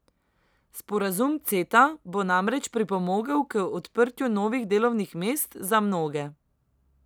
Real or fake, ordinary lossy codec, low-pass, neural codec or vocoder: real; none; none; none